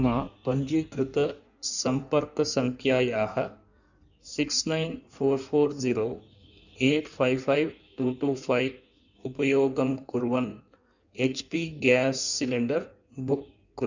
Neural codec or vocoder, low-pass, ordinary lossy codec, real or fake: codec, 16 kHz in and 24 kHz out, 1.1 kbps, FireRedTTS-2 codec; 7.2 kHz; none; fake